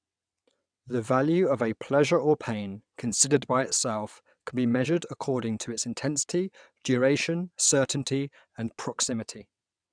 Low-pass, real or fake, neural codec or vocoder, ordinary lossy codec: 9.9 kHz; fake; vocoder, 22.05 kHz, 80 mel bands, WaveNeXt; none